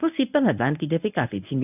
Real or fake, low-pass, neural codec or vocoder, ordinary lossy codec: fake; 3.6 kHz; codec, 24 kHz, 0.9 kbps, WavTokenizer, medium speech release version 1; none